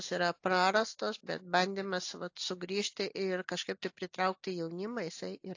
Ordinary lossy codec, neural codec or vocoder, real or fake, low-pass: AAC, 48 kbps; none; real; 7.2 kHz